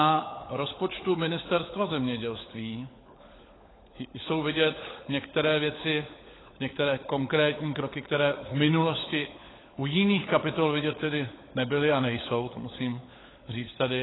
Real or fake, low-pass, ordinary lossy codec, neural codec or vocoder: fake; 7.2 kHz; AAC, 16 kbps; codec, 16 kHz, 16 kbps, FunCodec, trained on LibriTTS, 50 frames a second